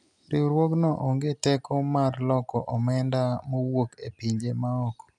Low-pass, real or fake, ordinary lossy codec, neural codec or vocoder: none; real; none; none